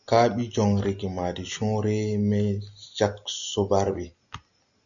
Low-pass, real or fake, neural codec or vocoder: 7.2 kHz; real; none